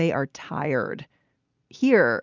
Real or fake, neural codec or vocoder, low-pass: real; none; 7.2 kHz